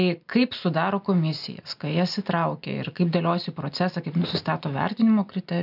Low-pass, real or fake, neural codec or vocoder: 5.4 kHz; real; none